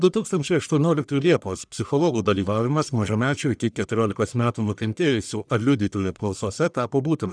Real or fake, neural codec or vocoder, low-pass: fake; codec, 44.1 kHz, 1.7 kbps, Pupu-Codec; 9.9 kHz